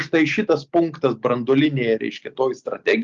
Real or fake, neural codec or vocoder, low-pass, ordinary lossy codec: real; none; 7.2 kHz; Opus, 32 kbps